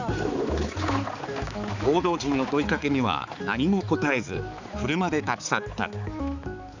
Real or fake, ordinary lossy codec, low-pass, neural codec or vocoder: fake; none; 7.2 kHz; codec, 16 kHz, 4 kbps, X-Codec, HuBERT features, trained on balanced general audio